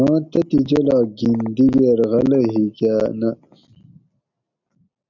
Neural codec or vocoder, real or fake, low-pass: none; real; 7.2 kHz